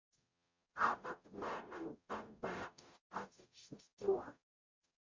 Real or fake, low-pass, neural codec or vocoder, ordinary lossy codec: fake; 7.2 kHz; codec, 44.1 kHz, 0.9 kbps, DAC; MP3, 48 kbps